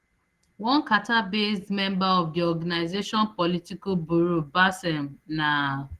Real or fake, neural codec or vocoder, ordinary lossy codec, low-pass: real; none; Opus, 16 kbps; 14.4 kHz